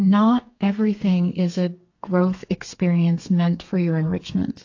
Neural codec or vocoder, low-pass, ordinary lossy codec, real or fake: codec, 32 kHz, 1.9 kbps, SNAC; 7.2 kHz; AAC, 48 kbps; fake